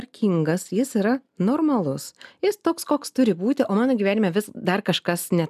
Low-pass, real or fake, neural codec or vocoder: 14.4 kHz; real; none